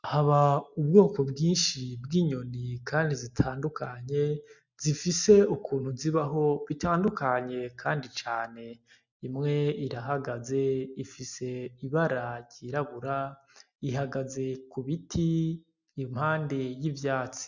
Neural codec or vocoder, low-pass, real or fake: none; 7.2 kHz; real